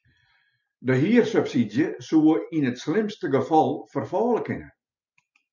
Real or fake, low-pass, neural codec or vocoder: real; 7.2 kHz; none